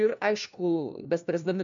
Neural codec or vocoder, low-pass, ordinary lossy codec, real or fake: codec, 16 kHz, 1 kbps, FunCodec, trained on LibriTTS, 50 frames a second; 7.2 kHz; MP3, 64 kbps; fake